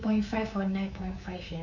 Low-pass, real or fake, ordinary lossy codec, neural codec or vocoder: 7.2 kHz; fake; none; codec, 24 kHz, 3.1 kbps, DualCodec